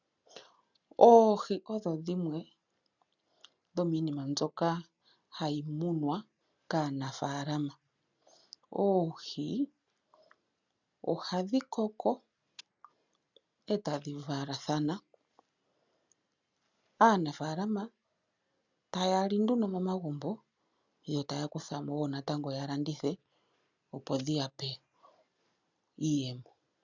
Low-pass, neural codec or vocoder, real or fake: 7.2 kHz; none; real